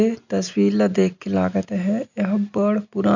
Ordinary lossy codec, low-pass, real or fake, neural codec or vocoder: none; 7.2 kHz; real; none